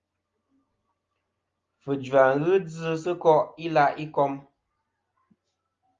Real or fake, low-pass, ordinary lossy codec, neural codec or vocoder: real; 7.2 kHz; Opus, 32 kbps; none